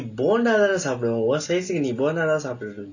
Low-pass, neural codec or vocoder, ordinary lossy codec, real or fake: 7.2 kHz; none; none; real